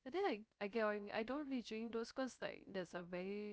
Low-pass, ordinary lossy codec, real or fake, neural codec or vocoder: none; none; fake; codec, 16 kHz, 0.3 kbps, FocalCodec